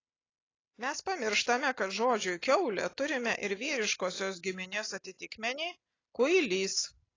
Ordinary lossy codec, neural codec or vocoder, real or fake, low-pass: AAC, 32 kbps; none; real; 7.2 kHz